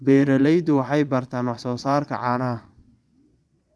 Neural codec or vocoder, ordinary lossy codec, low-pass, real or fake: vocoder, 22.05 kHz, 80 mel bands, Vocos; none; none; fake